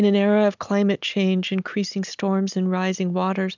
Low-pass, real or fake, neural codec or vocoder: 7.2 kHz; real; none